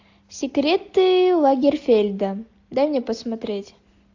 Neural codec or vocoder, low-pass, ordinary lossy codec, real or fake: none; 7.2 kHz; AAC, 48 kbps; real